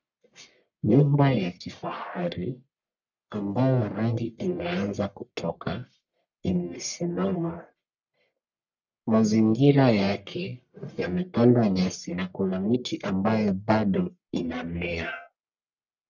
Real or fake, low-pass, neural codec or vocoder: fake; 7.2 kHz; codec, 44.1 kHz, 1.7 kbps, Pupu-Codec